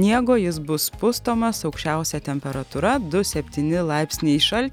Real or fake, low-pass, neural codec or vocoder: real; 19.8 kHz; none